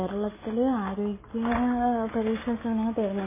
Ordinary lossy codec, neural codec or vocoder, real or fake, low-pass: MP3, 16 kbps; none; real; 3.6 kHz